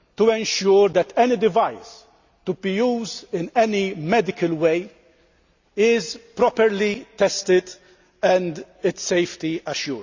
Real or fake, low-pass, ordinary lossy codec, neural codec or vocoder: real; 7.2 kHz; Opus, 64 kbps; none